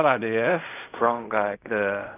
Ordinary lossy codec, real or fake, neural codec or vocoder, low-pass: none; fake; codec, 16 kHz in and 24 kHz out, 0.4 kbps, LongCat-Audio-Codec, fine tuned four codebook decoder; 3.6 kHz